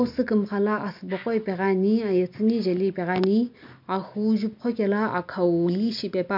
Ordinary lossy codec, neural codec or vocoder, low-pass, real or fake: MP3, 48 kbps; none; 5.4 kHz; real